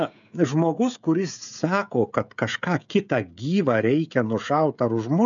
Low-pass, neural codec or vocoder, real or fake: 7.2 kHz; codec, 16 kHz, 16 kbps, FreqCodec, smaller model; fake